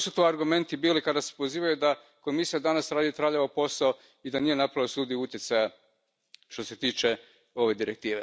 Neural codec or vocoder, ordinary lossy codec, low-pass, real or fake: none; none; none; real